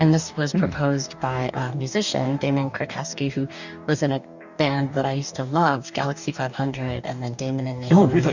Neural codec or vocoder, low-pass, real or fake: codec, 44.1 kHz, 2.6 kbps, DAC; 7.2 kHz; fake